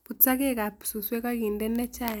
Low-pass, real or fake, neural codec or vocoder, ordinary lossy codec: none; real; none; none